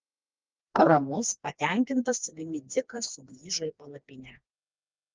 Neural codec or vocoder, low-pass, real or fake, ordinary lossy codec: codec, 16 kHz, 2 kbps, FreqCodec, smaller model; 7.2 kHz; fake; Opus, 24 kbps